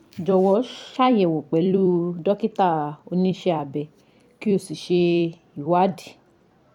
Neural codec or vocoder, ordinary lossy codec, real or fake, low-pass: vocoder, 44.1 kHz, 128 mel bands every 256 samples, BigVGAN v2; MP3, 96 kbps; fake; 19.8 kHz